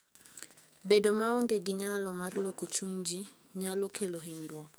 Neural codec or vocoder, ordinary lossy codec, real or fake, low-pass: codec, 44.1 kHz, 2.6 kbps, SNAC; none; fake; none